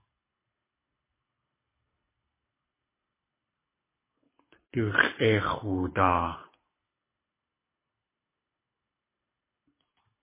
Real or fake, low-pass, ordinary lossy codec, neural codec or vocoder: fake; 3.6 kHz; MP3, 16 kbps; codec, 24 kHz, 6 kbps, HILCodec